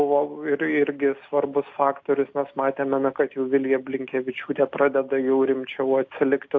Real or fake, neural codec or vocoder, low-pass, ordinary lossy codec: real; none; 7.2 kHz; AAC, 48 kbps